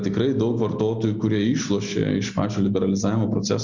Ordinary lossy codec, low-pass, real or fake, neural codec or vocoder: Opus, 64 kbps; 7.2 kHz; real; none